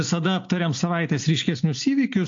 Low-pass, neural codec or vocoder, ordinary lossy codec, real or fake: 7.2 kHz; none; AAC, 48 kbps; real